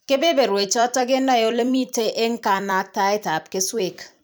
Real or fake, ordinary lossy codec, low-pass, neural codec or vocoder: fake; none; none; vocoder, 44.1 kHz, 128 mel bands every 256 samples, BigVGAN v2